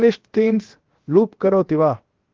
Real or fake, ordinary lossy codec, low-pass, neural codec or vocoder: fake; Opus, 16 kbps; 7.2 kHz; codec, 16 kHz, 0.7 kbps, FocalCodec